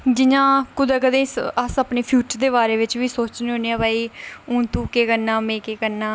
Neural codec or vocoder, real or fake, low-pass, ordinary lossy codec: none; real; none; none